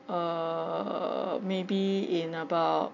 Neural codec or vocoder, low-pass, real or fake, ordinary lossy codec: none; 7.2 kHz; real; none